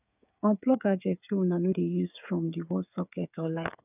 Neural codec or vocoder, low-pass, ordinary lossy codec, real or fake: codec, 16 kHz, 8 kbps, FreqCodec, smaller model; 3.6 kHz; none; fake